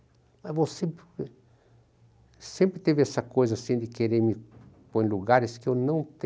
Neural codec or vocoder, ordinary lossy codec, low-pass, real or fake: none; none; none; real